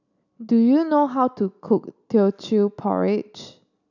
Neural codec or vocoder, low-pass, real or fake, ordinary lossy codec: none; 7.2 kHz; real; none